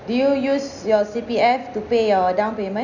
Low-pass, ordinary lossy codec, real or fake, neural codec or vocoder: 7.2 kHz; none; real; none